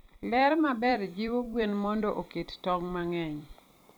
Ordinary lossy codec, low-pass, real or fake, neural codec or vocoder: none; none; fake; vocoder, 44.1 kHz, 128 mel bands every 256 samples, BigVGAN v2